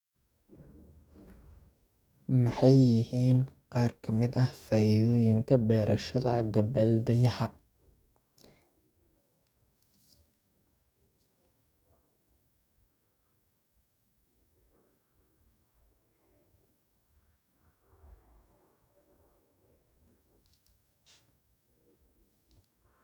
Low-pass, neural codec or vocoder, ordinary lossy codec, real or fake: 19.8 kHz; codec, 44.1 kHz, 2.6 kbps, DAC; none; fake